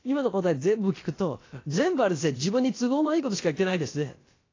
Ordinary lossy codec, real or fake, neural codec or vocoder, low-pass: AAC, 32 kbps; fake; codec, 16 kHz, about 1 kbps, DyCAST, with the encoder's durations; 7.2 kHz